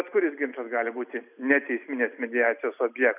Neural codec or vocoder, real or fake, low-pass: none; real; 3.6 kHz